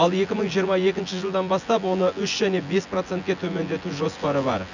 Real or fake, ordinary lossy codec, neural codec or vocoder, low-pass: fake; none; vocoder, 24 kHz, 100 mel bands, Vocos; 7.2 kHz